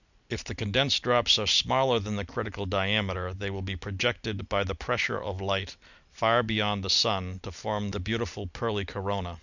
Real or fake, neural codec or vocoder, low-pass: real; none; 7.2 kHz